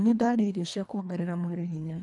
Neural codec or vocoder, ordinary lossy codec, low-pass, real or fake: codec, 24 kHz, 1.5 kbps, HILCodec; none; 10.8 kHz; fake